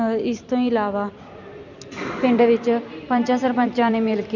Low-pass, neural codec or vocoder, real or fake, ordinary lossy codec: 7.2 kHz; vocoder, 44.1 kHz, 128 mel bands every 256 samples, BigVGAN v2; fake; none